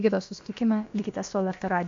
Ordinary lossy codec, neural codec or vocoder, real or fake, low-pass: AAC, 48 kbps; codec, 16 kHz, about 1 kbps, DyCAST, with the encoder's durations; fake; 7.2 kHz